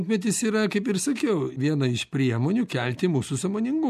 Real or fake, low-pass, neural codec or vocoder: real; 14.4 kHz; none